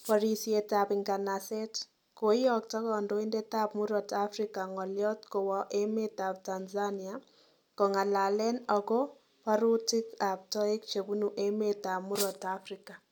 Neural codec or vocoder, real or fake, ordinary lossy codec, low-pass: none; real; none; 19.8 kHz